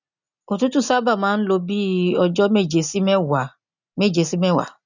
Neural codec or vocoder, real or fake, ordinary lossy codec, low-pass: none; real; none; 7.2 kHz